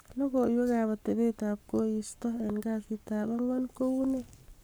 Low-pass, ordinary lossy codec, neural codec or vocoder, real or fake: none; none; codec, 44.1 kHz, 7.8 kbps, Pupu-Codec; fake